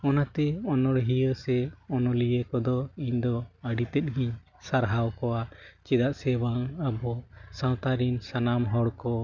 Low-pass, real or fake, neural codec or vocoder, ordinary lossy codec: 7.2 kHz; real; none; none